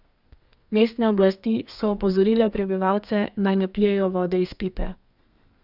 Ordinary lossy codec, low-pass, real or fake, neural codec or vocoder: Opus, 64 kbps; 5.4 kHz; fake; codec, 32 kHz, 1.9 kbps, SNAC